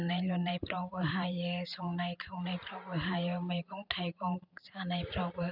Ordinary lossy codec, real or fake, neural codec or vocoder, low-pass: none; fake; vocoder, 44.1 kHz, 128 mel bands every 512 samples, BigVGAN v2; 5.4 kHz